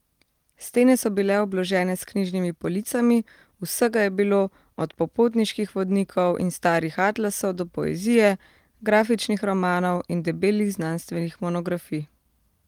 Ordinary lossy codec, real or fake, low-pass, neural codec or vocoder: Opus, 24 kbps; real; 19.8 kHz; none